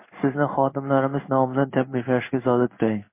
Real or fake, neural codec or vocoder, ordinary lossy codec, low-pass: fake; codec, 16 kHz in and 24 kHz out, 1 kbps, XY-Tokenizer; MP3, 24 kbps; 3.6 kHz